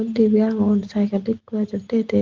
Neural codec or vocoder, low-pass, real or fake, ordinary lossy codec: none; 7.2 kHz; real; Opus, 16 kbps